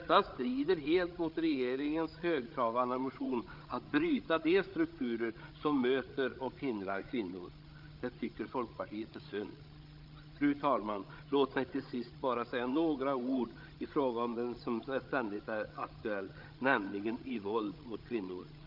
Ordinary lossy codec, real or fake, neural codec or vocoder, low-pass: Opus, 24 kbps; fake; codec, 16 kHz, 16 kbps, FreqCodec, larger model; 5.4 kHz